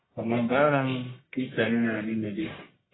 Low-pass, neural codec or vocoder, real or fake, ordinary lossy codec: 7.2 kHz; codec, 44.1 kHz, 1.7 kbps, Pupu-Codec; fake; AAC, 16 kbps